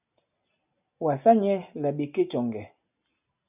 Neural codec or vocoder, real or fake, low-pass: none; real; 3.6 kHz